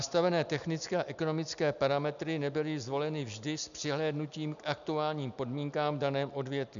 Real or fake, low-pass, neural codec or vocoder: real; 7.2 kHz; none